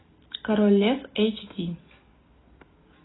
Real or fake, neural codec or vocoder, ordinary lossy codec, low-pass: real; none; AAC, 16 kbps; 7.2 kHz